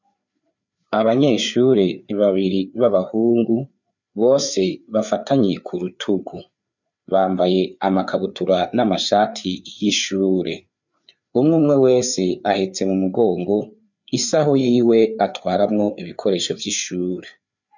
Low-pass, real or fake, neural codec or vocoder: 7.2 kHz; fake; codec, 16 kHz, 4 kbps, FreqCodec, larger model